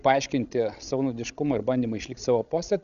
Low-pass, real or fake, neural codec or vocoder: 7.2 kHz; fake; codec, 16 kHz, 16 kbps, FreqCodec, larger model